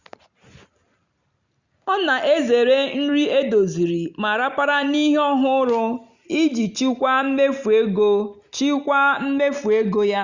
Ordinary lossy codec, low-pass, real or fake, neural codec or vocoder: Opus, 64 kbps; 7.2 kHz; real; none